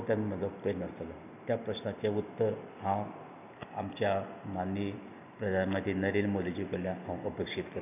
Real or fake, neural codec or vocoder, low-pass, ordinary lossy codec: real; none; 3.6 kHz; none